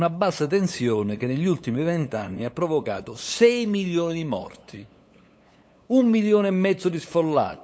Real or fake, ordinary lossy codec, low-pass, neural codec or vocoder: fake; none; none; codec, 16 kHz, 8 kbps, FunCodec, trained on LibriTTS, 25 frames a second